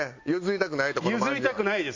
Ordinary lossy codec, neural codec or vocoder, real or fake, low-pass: AAC, 48 kbps; none; real; 7.2 kHz